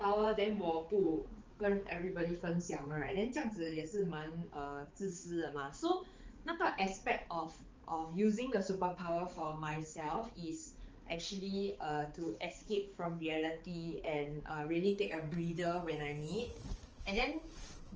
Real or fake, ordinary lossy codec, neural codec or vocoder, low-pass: fake; Opus, 24 kbps; codec, 16 kHz, 4 kbps, X-Codec, HuBERT features, trained on balanced general audio; 7.2 kHz